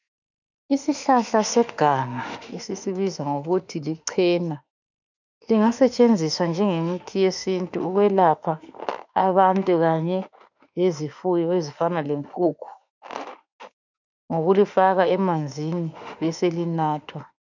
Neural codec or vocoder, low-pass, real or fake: autoencoder, 48 kHz, 32 numbers a frame, DAC-VAE, trained on Japanese speech; 7.2 kHz; fake